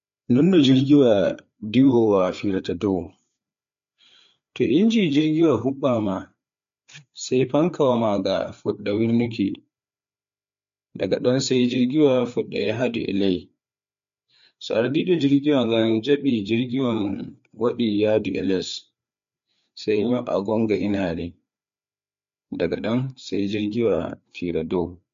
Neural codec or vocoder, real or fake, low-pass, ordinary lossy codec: codec, 16 kHz, 4 kbps, FreqCodec, larger model; fake; 7.2 kHz; MP3, 48 kbps